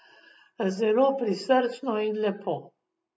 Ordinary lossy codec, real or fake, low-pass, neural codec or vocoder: none; real; none; none